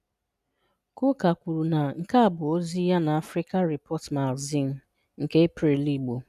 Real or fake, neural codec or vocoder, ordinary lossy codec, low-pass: real; none; none; 14.4 kHz